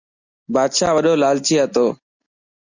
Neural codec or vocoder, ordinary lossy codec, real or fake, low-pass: none; Opus, 64 kbps; real; 7.2 kHz